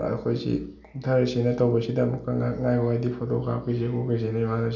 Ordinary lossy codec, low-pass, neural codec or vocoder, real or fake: none; 7.2 kHz; none; real